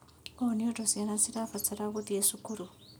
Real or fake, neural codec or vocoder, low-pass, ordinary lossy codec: real; none; none; none